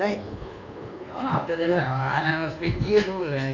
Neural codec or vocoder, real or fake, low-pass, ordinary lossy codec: codec, 24 kHz, 1.2 kbps, DualCodec; fake; 7.2 kHz; none